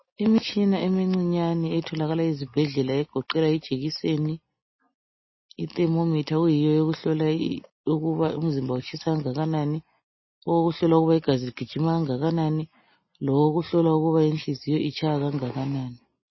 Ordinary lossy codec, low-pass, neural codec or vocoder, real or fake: MP3, 24 kbps; 7.2 kHz; none; real